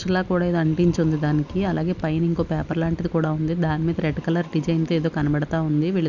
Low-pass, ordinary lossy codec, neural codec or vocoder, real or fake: 7.2 kHz; none; none; real